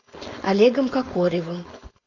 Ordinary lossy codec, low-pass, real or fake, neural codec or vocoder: AAC, 48 kbps; 7.2 kHz; fake; codec, 16 kHz, 4.8 kbps, FACodec